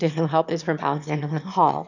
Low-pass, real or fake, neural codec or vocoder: 7.2 kHz; fake; autoencoder, 22.05 kHz, a latent of 192 numbers a frame, VITS, trained on one speaker